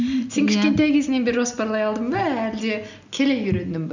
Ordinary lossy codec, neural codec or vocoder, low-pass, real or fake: none; none; 7.2 kHz; real